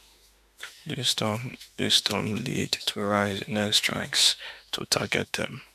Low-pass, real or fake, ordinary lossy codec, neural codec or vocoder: 14.4 kHz; fake; none; autoencoder, 48 kHz, 32 numbers a frame, DAC-VAE, trained on Japanese speech